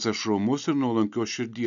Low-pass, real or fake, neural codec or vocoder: 7.2 kHz; real; none